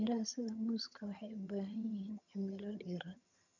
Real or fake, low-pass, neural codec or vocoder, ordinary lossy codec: fake; 7.2 kHz; vocoder, 22.05 kHz, 80 mel bands, HiFi-GAN; none